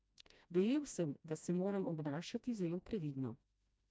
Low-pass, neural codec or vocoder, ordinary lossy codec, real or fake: none; codec, 16 kHz, 1 kbps, FreqCodec, smaller model; none; fake